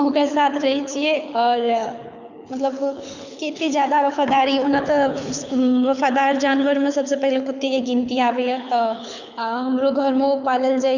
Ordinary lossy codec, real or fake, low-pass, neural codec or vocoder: none; fake; 7.2 kHz; codec, 24 kHz, 6 kbps, HILCodec